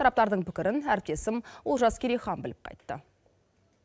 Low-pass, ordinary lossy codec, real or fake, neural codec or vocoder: none; none; real; none